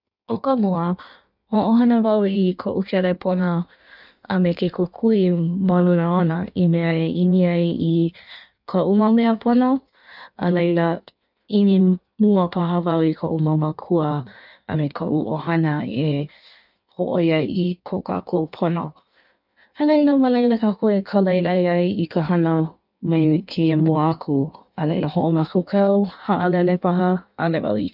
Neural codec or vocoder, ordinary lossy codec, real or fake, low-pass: codec, 16 kHz in and 24 kHz out, 1.1 kbps, FireRedTTS-2 codec; none; fake; 5.4 kHz